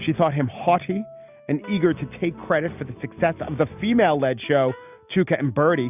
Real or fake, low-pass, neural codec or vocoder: real; 3.6 kHz; none